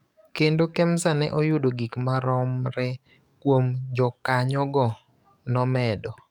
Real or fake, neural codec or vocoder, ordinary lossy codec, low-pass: fake; codec, 44.1 kHz, 7.8 kbps, DAC; none; 19.8 kHz